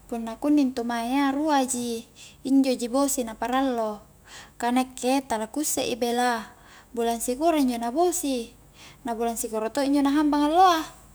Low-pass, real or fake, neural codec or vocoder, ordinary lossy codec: none; fake; autoencoder, 48 kHz, 128 numbers a frame, DAC-VAE, trained on Japanese speech; none